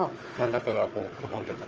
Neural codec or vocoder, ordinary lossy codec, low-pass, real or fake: codec, 16 kHz, 4 kbps, FunCodec, trained on Chinese and English, 50 frames a second; Opus, 24 kbps; 7.2 kHz; fake